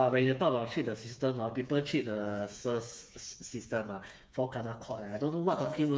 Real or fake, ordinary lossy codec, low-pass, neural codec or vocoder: fake; none; none; codec, 16 kHz, 4 kbps, FreqCodec, smaller model